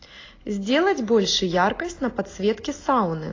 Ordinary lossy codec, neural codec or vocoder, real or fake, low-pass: AAC, 32 kbps; none; real; 7.2 kHz